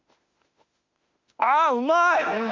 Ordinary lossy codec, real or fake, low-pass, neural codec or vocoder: Opus, 64 kbps; fake; 7.2 kHz; autoencoder, 48 kHz, 32 numbers a frame, DAC-VAE, trained on Japanese speech